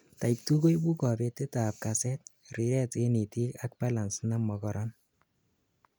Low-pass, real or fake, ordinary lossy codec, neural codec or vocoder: none; real; none; none